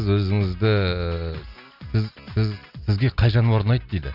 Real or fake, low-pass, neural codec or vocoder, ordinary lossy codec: real; 5.4 kHz; none; none